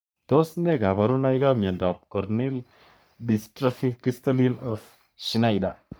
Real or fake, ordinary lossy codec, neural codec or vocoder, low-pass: fake; none; codec, 44.1 kHz, 3.4 kbps, Pupu-Codec; none